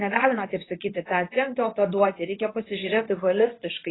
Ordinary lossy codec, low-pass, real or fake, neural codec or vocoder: AAC, 16 kbps; 7.2 kHz; fake; codec, 24 kHz, 0.9 kbps, WavTokenizer, medium speech release version 2